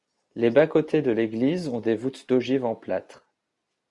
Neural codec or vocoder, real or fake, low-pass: none; real; 10.8 kHz